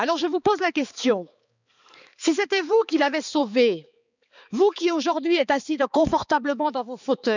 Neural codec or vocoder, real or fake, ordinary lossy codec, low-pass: codec, 16 kHz, 4 kbps, X-Codec, HuBERT features, trained on balanced general audio; fake; none; 7.2 kHz